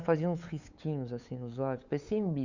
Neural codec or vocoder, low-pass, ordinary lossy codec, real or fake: codec, 16 kHz, 8 kbps, FunCodec, trained on LibriTTS, 25 frames a second; 7.2 kHz; Opus, 64 kbps; fake